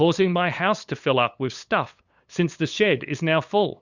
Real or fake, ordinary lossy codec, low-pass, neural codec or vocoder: fake; Opus, 64 kbps; 7.2 kHz; codec, 16 kHz, 8 kbps, FunCodec, trained on LibriTTS, 25 frames a second